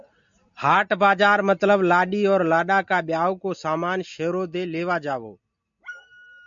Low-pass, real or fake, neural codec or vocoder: 7.2 kHz; real; none